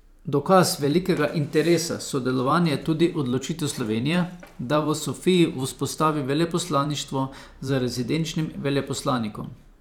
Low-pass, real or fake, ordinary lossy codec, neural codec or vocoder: 19.8 kHz; fake; none; vocoder, 44.1 kHz, 128 mel bands every 512 samples, BigVGAN v2